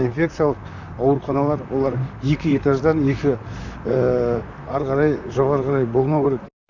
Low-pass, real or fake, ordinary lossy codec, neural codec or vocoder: 7.2 kHz; fake; none; vocoder, 44.1 kHz, 128 mel bands, Pupu-Vocoder